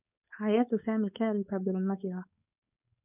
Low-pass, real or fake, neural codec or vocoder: 3.6 kHz; fake; codec, 16 kHz, 4.8 kbps, FACodec